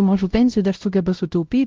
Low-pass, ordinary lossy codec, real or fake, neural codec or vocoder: 7.2 kHz; Opus, 32 kbps; fake; codec, 16 kHz, 0.5 kbps, X-Codec, WavLM features, trained on Multilingual LibriSpeech